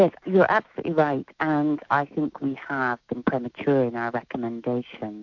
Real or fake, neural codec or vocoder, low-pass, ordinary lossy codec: fake; autoencoder, 48 kHz, 128 numbers a frame, DAC-VAE, trained on Japanese speech; 7.2 kHz; AAC, 48 kbps